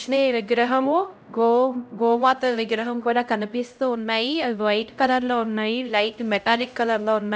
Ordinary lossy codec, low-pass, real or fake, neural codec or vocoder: none; none; fake; codec, 16 kHz, 0.5 kbps, X-Codec, HuBERT features, trained on LibriSpeech